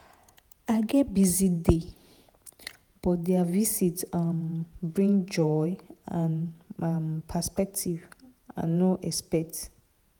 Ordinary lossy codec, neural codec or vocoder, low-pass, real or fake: none; vocoder, 48 kHz, 128 mel bands, Vocos; none; fake